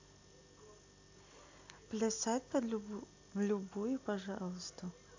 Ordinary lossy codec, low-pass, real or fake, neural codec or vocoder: AAC, 48 kbps; 7.2 kHz; real; none